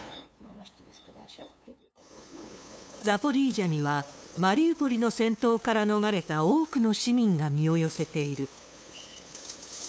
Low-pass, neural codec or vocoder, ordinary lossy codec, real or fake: none; codec, 16 kHz, 2 kbps, FunCodec, trained on LibriTTS, 25 frames a second; none; fake